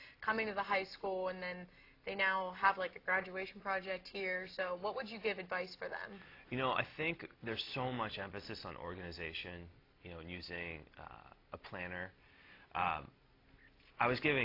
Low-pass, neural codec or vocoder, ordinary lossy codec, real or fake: 5.4 kHz; none; AAC, 32 kbps; real